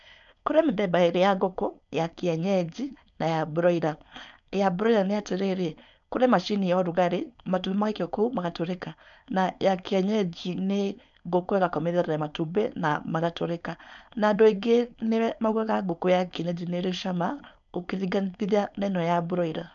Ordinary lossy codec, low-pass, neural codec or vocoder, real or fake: none; 7.2 kHz; codec, 16 kHz, 4.8 kbps, FACodec; fake